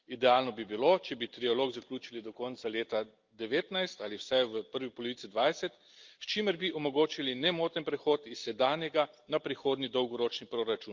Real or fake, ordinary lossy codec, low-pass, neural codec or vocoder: real; Opus, 24 kbps; 7.2 kHz; none